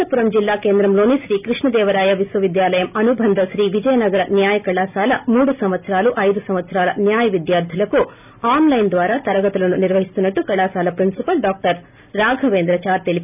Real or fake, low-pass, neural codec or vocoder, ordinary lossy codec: real; 3.6 kHz; none; none